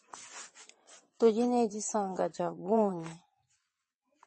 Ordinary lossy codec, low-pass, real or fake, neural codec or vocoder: MP3, 32 kbps; 9.9 kHz; fake; vocoder, 22.05 kHz, 80 mel bands, WaveNeXt